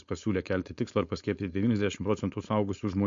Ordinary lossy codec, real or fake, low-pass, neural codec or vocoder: MP3, 48 kbps; fake; 7.2 kHz; codec, 16 kHz, 4.8 kbps, FACodec